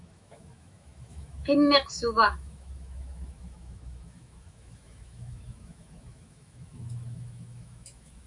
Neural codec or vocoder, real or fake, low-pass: autoencoder, 48 kHz, 128 numbers a frame, DAC-VAE, trained on Japanese speech; fake; 10.8 kHz